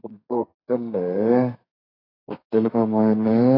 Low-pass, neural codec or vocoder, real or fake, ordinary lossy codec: 5.4 kHz; codec, 32 kHz, 1.9 kbps, SNAC; fake; AAC, 24 kbps